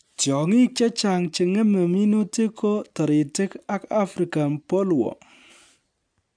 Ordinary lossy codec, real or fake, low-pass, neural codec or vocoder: MP3, 96 kbps; real; 9.9 kHz; none